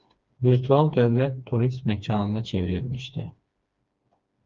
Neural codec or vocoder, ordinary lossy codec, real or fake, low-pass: codec, 16 kHz, 2 kbps, FreqCodec, smaller model; Opus, 32 kbps; fake; 7.2 kHz